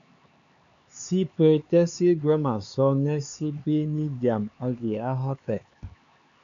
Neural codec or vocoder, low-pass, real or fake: codec, 16 kHz, 4 kbps, X-Codec, HuBERT features, trained on LibriSpeech; 7.2 kHz; fake